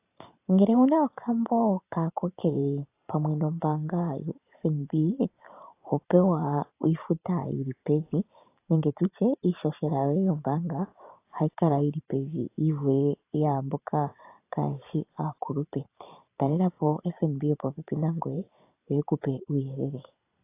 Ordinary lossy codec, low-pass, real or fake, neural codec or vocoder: AAC, 24 kbps; 3.6 kHz; fake; vocoder, 44.1 kHz, 128 mel bands every 512 samples, BigVGAN v2